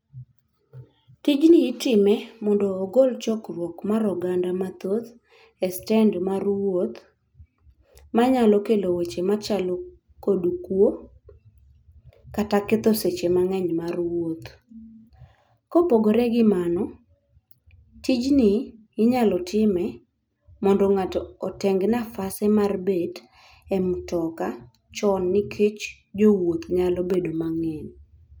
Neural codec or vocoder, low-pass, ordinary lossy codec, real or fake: none; none; none; real